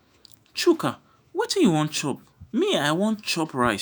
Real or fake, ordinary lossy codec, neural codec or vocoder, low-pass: fake; none; autoencoder, 48 kHz, 128 numbers a frame, DAC-VAE, trained on Japanese speech; none